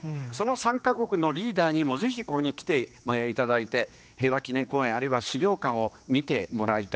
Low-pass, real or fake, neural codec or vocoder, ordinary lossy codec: none; fake; codec, 16 kHz, 2 kbps, X-Codec, HuBERT features, trained on general audio; none